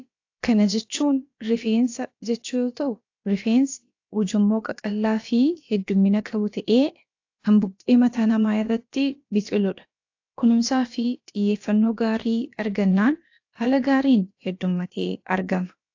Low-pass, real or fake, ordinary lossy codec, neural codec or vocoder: 7.2 kHz; fake; AAC, 48 kbps; codec, 16 kHz, about 1 kbps, DyCAST, with the encoder's durations